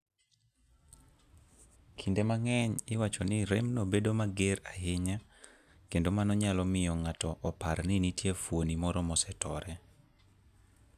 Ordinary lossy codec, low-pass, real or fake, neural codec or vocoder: none; 14.4 kHz; real; none